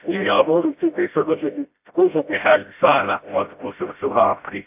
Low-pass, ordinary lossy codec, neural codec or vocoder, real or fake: 3.6 kHz; AAC, 32 kbps; codec, 16 kHz, 0.5 kbps, FreqCodec, smaller model; fake